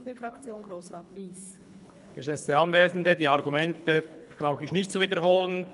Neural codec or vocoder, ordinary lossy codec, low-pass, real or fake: codec, 24 kHz, 3 kbps, HILCodec; AAC, 96 kbps; 10.8 kHz; fake